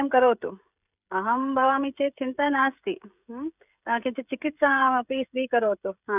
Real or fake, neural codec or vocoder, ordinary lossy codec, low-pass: fake; codec, 16 kHz, 16 kbps, FreqCodec, smaller model; none; 3.6 kHz